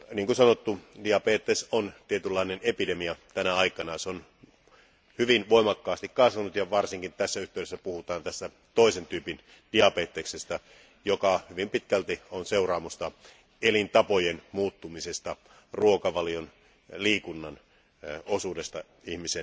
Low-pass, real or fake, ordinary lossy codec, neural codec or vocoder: none; real; none; none